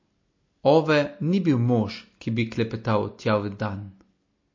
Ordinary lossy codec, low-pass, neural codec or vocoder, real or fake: MP3, 32 kbps; 7.2 kHz; none; real